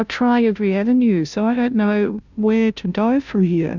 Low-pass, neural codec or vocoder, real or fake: 7.2 kHz; codec, 16 kHz, 0.5 kbps, FunCodec, trained on Chinese and English, 25 frames a second; fake